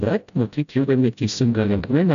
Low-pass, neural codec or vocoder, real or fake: 7.2 kHz; codec, 16 kHz, 0.5 kbps, FreqCodec, smaller model; fake